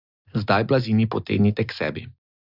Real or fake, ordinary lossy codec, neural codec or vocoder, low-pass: real; Opus, 64 kbps; none; 5.4 kHz